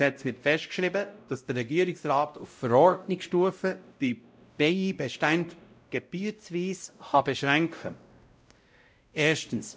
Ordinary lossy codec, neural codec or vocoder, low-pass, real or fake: none; codec, 16 kHz, 0.5 kbps, X-Codec, WavLM features, trained on Multilingual LibriSpeech; none; fake